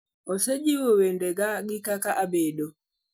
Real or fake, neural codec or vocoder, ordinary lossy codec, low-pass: real; none; none; none